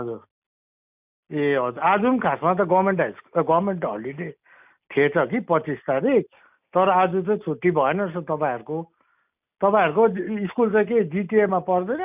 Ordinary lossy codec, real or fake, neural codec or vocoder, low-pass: none; real; none; 3.6 kHz